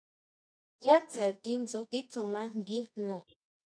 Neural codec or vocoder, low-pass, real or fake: codec, 24 kHz, 0.9 kbps, WavTokenizer, medium music audio release; 9.9 kHz; fake